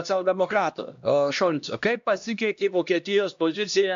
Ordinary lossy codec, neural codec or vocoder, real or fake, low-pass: MP3, 64 kbps; codec, 16 kHz, 1 kbps, X-Codec, HuBERT features, trained on LibriSpeech; fake; 7.2 kHz